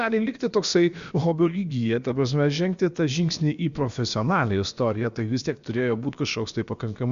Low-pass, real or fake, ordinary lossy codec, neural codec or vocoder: 7.2 kHz; fake; Opus, 64 kbps; codec, 16 kHz, about 1 kbps, DyCAST, with the encoder's durations